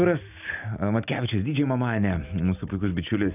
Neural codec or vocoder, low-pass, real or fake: none; 3.6 kHz; real